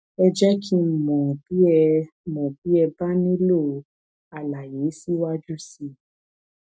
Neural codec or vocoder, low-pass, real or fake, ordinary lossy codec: none; none; real; none